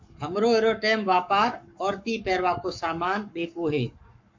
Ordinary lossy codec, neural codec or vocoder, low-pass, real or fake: MP3, 48 kbps; codec, 44.1 kHz, 7.8 kbps, Pupu-Codec; 7.2 kHz; fake